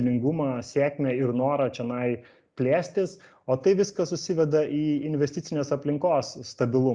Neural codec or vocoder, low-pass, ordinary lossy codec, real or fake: none; 9.9 kHz; Opus, 64 kbps; real